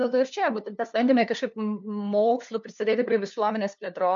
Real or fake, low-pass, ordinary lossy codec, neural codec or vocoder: fake; 7.2 kHz; MP3, 64 kbps; codec, 16 kHz, 2 kbps, FunCodec, trained on LibriTTS, 25 frames a second